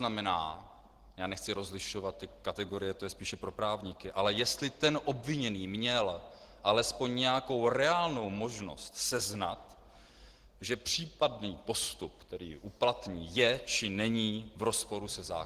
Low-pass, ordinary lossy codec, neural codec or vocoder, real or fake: 14.4 kHz; Opus, 16 kbps; none; real